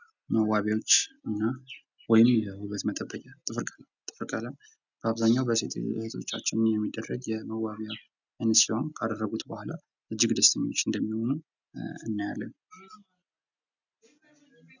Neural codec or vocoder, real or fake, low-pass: none; real; 7.2 kHz